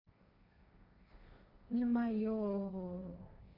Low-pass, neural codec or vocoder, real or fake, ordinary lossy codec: 5.4 kHz; codec, 16 kHz, 1.1 kbps, Voila-Tokenizer; fake; none